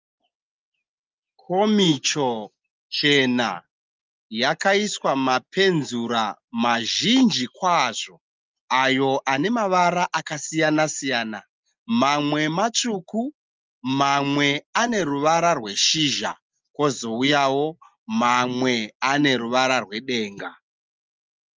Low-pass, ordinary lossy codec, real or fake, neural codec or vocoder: 7.2 kHz; Opus, 24 kbps; real; none